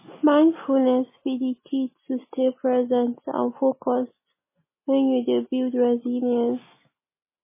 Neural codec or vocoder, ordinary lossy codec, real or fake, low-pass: none; MP3, 16 kbps; real; 3.6 kHz